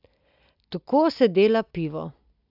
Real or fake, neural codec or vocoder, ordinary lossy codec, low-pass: real; none; AAC, 48 kbps; 5.4 kHz